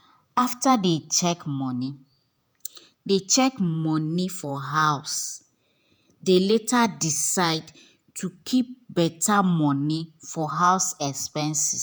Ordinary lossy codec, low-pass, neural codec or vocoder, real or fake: none; none; vocoder, 48 kHz, 128 mel bands, Vocos; fake